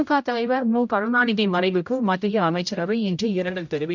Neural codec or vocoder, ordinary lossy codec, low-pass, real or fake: codec, 16 kHz, 0.5 kbps, X-Codec, HuBERT features, trained on general audio; none; 7.2 kHz; fake